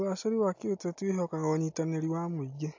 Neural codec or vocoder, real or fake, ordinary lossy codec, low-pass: none; real; AAC, 48 kbps; 7.2 kHz